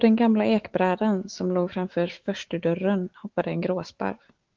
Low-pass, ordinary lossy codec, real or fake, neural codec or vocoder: 7.2 kHz; Opus, 16 kbps; fake; autoencoder, 48 kHz, 128 numbers a frame, DAC-VAE, trained on Japanese speech